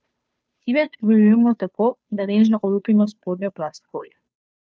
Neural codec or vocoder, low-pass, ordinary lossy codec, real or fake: codec, 16 kHz, 2 kbps, FunCodec, trained on Chinese and English, 25 frames a second; none; none; fake